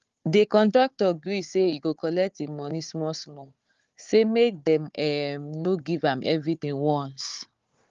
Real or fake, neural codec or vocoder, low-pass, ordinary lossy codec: fake; codec, 16 kHz, 4 kbps, FunCodec, trained on Chinese and English, 50 frames a second; 7.2 kHz; Opus, 32 kbps